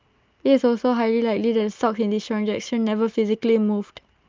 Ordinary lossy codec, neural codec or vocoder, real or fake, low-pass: Opus, 24 kbps; none; real; 7.2 kHz